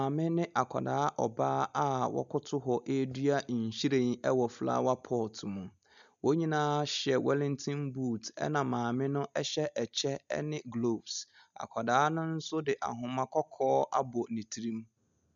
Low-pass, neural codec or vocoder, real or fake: 7.2 kHz; none; real